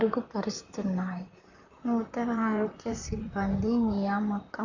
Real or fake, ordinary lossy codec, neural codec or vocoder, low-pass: fake; none; vocoder, 44.1 kHz, 128 mel bands, Pupu-Vocoder; 7.2 kHz